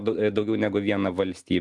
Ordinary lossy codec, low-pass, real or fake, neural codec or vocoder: Opus, 24 kbps; 10.8 kHz; real; none